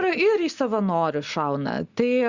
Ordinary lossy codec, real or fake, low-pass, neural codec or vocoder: Opus, 64 kbps; real; 7.2 kHz; none